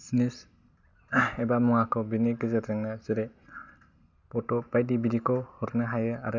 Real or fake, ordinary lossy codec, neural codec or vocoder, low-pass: real; none; none; 7.2 kHz